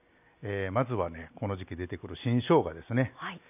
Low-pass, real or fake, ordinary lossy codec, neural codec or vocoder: 3.6 kHz; real; none; none